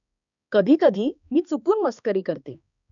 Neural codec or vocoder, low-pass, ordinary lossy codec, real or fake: codec, 16 kHz, 2 kbps, X-Codec, HuBERT features, trained on balanced general audio; 7.2 kHz; none; fake